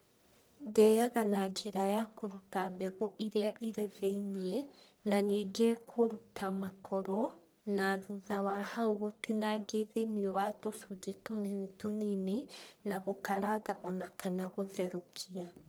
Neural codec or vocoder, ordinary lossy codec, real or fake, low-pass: codec, 44.1 kHz, 1.7 kbps, Pupu-Codec; none; fake; none